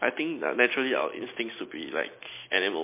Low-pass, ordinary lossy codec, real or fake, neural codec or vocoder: 3.6 kHz; MP3, 24 kbps; real; none